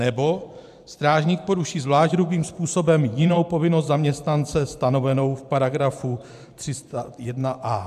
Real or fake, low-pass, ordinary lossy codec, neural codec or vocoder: fake; 14.4 kHz; AAC, 96 kbps; vocoder, 44.1 kHz, 128 mel bands every 512 samples, BigVGAN v2